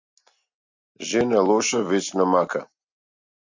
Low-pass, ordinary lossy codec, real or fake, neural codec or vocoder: 7.2 kHz; MP3, 64 kbps; real; none